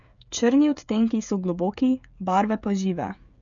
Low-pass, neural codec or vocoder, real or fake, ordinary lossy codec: 7.2 kHz; codec, 16 kHz, 16 kbps, FreqCodec, smaller model; fake; none